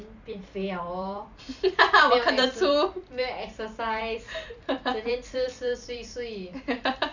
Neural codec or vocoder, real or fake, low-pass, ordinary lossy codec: vocoder, 44.1 kHz, 128 mel bands every 256 samples, BigVGAN v2; fake; 7.2 kHz; none